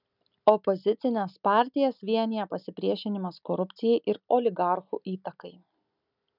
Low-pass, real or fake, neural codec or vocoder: 5.4 kHz; real; none